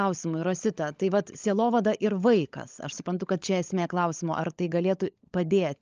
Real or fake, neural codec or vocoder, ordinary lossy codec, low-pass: fake; codec, 16 kHz, 16 kbps, FunCodec, trained on LibriTTS, 50 frames a second; Opus, 24 kbps; 7.2 kHz